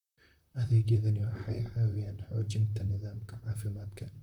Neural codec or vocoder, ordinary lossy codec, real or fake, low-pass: vocoder, 44.1 kHz, 128 mel bands, Pupu-Vocoder; none; fake; 19.8 kHz